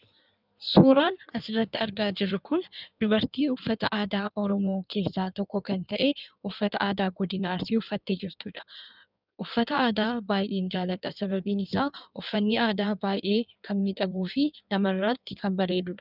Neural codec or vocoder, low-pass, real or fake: codec, 16 kHz in and 24 kHz out, 1.1 kbps, FireRedTTS-2 codec; 5.4 kHz; fake